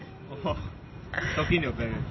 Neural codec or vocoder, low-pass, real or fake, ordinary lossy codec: none; 7.2 kHz; real; MP3, 24 kbps